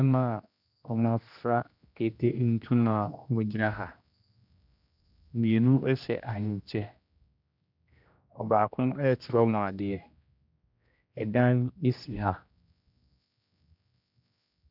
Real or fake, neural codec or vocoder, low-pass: fake; codec, 16 kHz, 1 kbps, X-Codec, HuBERT features, trained on general audio; 5.4 kHz